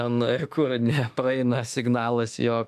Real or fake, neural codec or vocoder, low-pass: fake; autoencoder, 48 kHz, 32 numbers a frame, DAC-VAE, trained on Japanese speech; 14.4 kHz